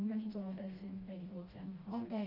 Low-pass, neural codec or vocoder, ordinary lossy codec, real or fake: 5.4 kHz; codec, 16 kHz, 1 kbps, FreqCodec, smaller model; Opus, 32 kbps; fake